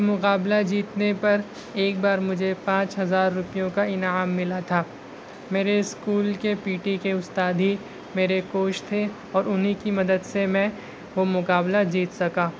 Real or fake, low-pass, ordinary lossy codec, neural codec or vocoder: real; none; none; none